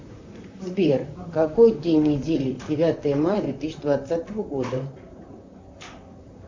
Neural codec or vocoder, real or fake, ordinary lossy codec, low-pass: vocoder, 44.1 kHz, 128 mel bands, Pupu-Vocoder; fake; MP3, 64 kbps; 7.2 kHz